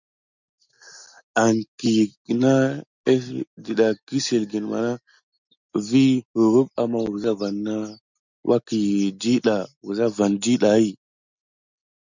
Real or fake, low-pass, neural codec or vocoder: real; 7.2 kHz; none